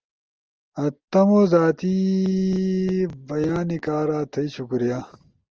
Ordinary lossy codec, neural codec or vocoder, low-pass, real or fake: Opus, 24 kbps; none; 7.2 kHz; real